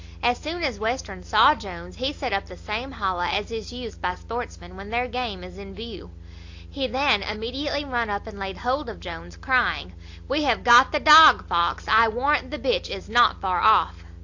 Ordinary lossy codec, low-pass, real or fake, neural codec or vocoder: AAC, 48 kbps; 7.2 kHz; real; none